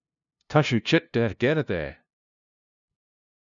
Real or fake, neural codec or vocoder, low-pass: fake; codec, 16 kHz, 0.5 kbps, FunCodec, trained on LibriTTS, 25 frames a second; 7.2 kHz